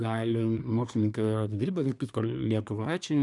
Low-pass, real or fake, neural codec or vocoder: 10.8 kHz; fake; codec, 24 kHz, 1 kbps, SNAC